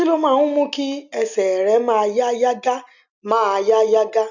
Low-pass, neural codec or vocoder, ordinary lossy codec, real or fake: 7.2 kHz; none; none; real